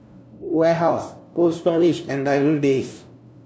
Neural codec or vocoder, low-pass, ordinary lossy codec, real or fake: codec, 16 kHz, 0.5 kbps, FunCodec, trained on LibriTTS, 25 frames a second; none; none; fake